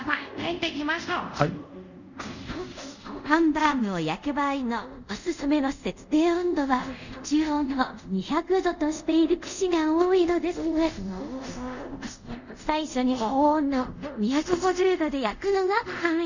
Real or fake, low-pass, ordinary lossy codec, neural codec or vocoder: fake; 7.2 kHz; none; codec, 24 kHz, 0.5 kbps, DualCodec